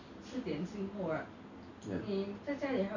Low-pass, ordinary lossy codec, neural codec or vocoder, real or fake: 7.2 kHz; none; none; real